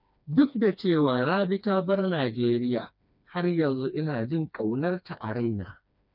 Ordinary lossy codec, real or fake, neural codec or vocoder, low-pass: none; fake; codec, 16 kHz, 2 kbps, FreqCodec, smaller model; 5.4 kHz